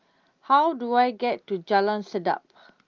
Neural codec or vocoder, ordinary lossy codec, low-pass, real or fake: none; Opus, 32 kbps; 7.2 kHz; real